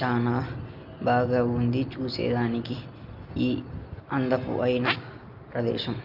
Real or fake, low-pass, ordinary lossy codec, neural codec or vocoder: real; 5.4 kHz; Opus, 24 kbps; none